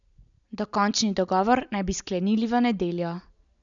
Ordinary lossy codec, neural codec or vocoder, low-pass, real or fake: none; none; 7.2 kHz; real